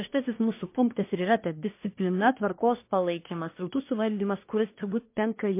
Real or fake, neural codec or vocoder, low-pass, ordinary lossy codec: fake; codec, 24 kHz, 1 kbps, SNAC; 3.6 kHz; MP3, 24 kbps